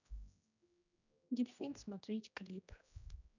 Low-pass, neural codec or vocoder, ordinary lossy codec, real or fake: 7.2 kHz; codec, 16 kHz, 1 kbps, X-Codec, HuBERT features, trained on general audio; none; fake